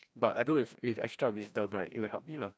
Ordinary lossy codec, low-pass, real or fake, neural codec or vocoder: none; none; fake; codec, 16 kHz, 1 kbps, FreqCodec, larger model